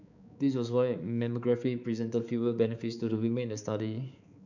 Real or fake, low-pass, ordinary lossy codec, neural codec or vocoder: fake; 7.2 kHz; none; codec, 16 kHz, 4 kbps, X-Codec, HuBERT features, trained on balanced general audio